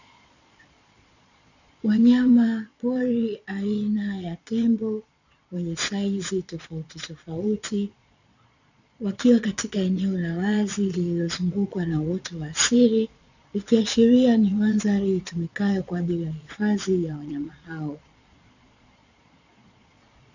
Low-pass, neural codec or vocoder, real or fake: 7.2 kHz; vocoder, 22.05 kHz, 80 mel bands, Vocos; fake